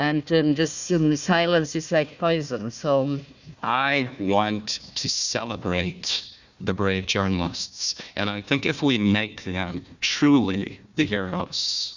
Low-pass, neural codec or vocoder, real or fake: 7.2 kHz; codec, 16 kHz, 1 kbps, FunCodec, trained on Chinese and English, 50 frames a second; fake